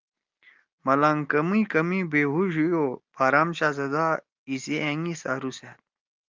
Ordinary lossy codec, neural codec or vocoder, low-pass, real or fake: Opus, 32 kbps; none; 7.2 kHz; real